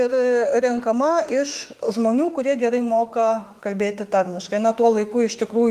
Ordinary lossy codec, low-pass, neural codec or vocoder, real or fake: Opus, 24 kbps; 14.4 kHz; autoencoder, 48 kHz, 32 numbers a frame, DAC-VAE, trained on Japanese speech; fake